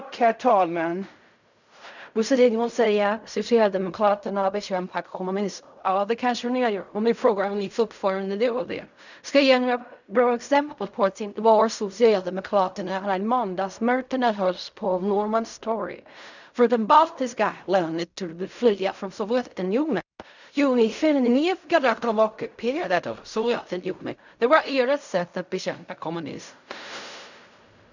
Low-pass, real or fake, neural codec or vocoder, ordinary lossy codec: 7.2 kHz; fake; codec, 16 kHz in and 24 kHz out, 0.4 kbps, LongCat-Audio-Codec, fine tuned four codebook decoder; none